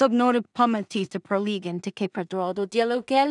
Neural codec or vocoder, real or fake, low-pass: codec, 16 kHz in and 24 kHz out, 0.4 kbps, LongCat-Audio-Codec, two codebook decoder; fake; 10.8 kHz